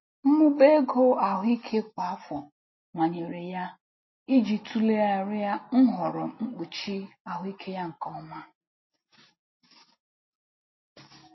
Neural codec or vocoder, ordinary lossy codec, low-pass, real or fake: none; MP3, 24 kbps; 7.2 kHz; real